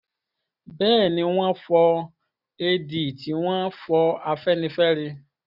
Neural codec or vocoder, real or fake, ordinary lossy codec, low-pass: none; real; none; 5.4 kHz